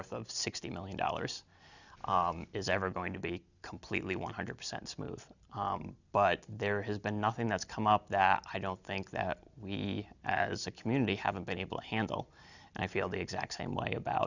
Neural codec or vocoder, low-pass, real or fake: none; 7.2 kHz; real